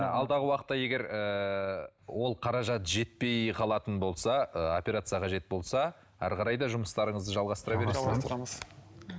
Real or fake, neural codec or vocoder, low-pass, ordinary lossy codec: real; none; none; none